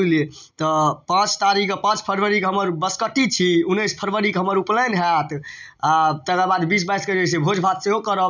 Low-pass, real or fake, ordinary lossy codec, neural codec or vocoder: 7.2 kHz; real; none; none